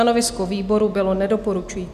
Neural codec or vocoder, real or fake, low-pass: none; real; 14.4 kHz